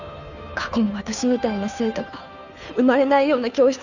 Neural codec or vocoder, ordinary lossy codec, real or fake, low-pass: codec, 16 kHz, 2 kbps, FunCodec, trained on Chinese and English, 25 frames a second; none; fake; 7.2 kHz